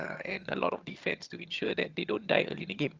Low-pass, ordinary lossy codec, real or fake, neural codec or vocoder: 7.2 kHz; Opus, 24 kbps; fake; vocoder, 22.05 kHz, 80 mel bands, HiFi-GAN